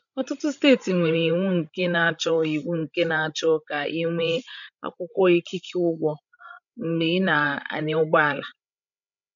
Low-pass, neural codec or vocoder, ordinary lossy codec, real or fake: 7.2 kHz; codec, 16 kHz, 16 kbps, FreqCodec, larger model; none; fake